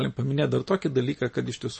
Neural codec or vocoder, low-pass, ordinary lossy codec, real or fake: none; 9.9 kHz; MP3, 32 kbps; real